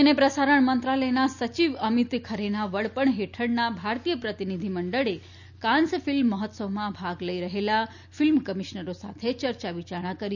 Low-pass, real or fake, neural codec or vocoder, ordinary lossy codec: 7.2 kHz; real; none; none